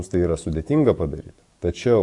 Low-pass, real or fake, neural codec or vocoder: 10.8 kHz; fake; codec, 44.1 kHz, 7.8 kbps, DAC